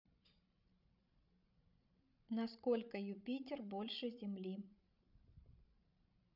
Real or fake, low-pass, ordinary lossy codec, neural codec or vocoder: fake; 5.4 kHz; none; codec, 16 kHz, 16 kbps, FreqCodec, larger model